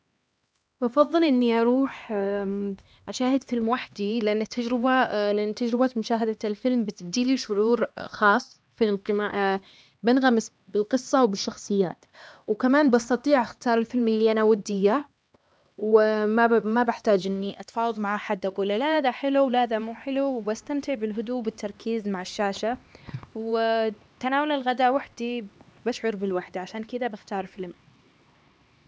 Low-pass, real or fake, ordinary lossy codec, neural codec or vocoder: none; fake; none; codec, 16 kHz, 2 kbps, X-Codec, HuBERT features, trained on LibriSpeech